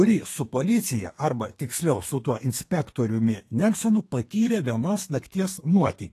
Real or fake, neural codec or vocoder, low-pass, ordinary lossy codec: fake; codec, 32 kHz, 1.9 kbps, SNAC; 14.4 kHz; AAC, 48 kbps